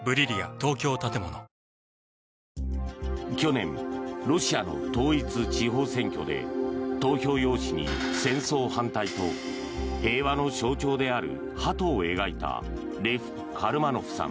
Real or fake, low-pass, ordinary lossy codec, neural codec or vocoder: real; none; none; none